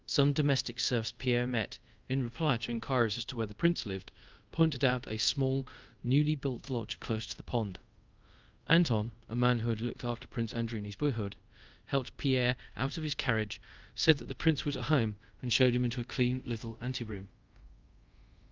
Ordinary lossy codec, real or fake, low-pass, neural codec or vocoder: Opus, 24 kbps; fake; 7.2 kHz; codec, 24 kHz, 0.5 kbps, DualCodec